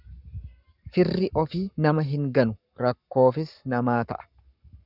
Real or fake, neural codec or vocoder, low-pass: fake; codec, 44.1 kHz, 7.8 kbps, Pupu-Codec; 5.4 kHz